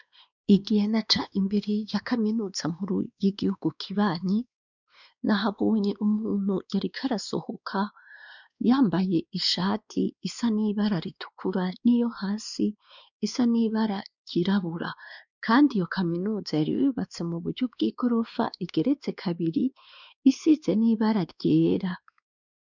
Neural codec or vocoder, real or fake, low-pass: codec, 16 kHz, 2 kbps, X-Codec, WavLM features, trained on Multilingual LibriSpeech; fake; 7.2 kHz